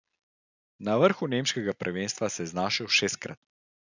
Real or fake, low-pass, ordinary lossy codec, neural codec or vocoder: real; 7.2 kHz; none; none